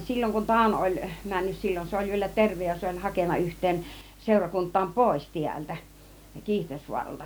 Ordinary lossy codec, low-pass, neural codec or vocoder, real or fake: none; none; none; real